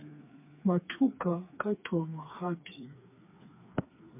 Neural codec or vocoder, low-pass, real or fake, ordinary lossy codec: codec, 16 kHz, 4 kbps, FreqCodec, smaller model; 3.6 kHz; fake; MP3, 32 kbps